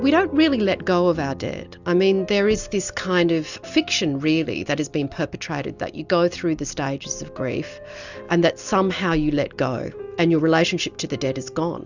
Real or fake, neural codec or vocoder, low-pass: real; none; 7.2 kHz